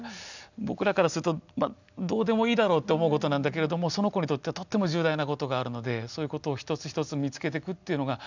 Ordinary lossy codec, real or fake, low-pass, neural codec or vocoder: none; real; 7.2 kHz; none